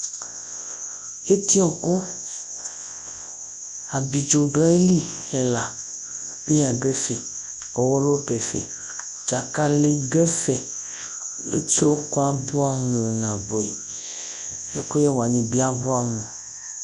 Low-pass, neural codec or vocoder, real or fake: 10.8 kHz; codec, 24 kHz, 0.9 kbps, WavTokenizer, large speech release; fake